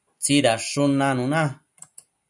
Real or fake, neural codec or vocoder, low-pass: real; none; 10.8 kHz